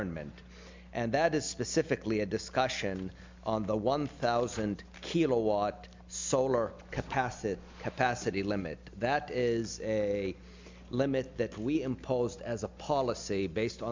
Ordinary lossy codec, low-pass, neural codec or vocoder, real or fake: MP3, 64 kbps; 7.2 kHz; none; real